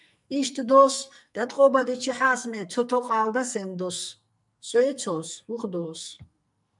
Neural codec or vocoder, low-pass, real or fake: codec, 44.1 kHz, 2.6 kbps, SNAC; 10.8 kHz; fake